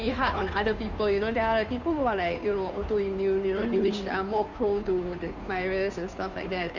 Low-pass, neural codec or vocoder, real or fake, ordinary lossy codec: 7.2 kHz; codec, 16 kHz, 2 kbps, FunCodec, trained on Chinese and English, 25 frames a second; fake; none